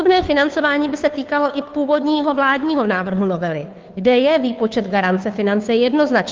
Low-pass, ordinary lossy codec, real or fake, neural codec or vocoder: 7.2 kHz; Opus, 32 kbps; fake; codec, 16 kHz, 2 kbps, FunCodec, trained on Chinese and English, 25 frames a second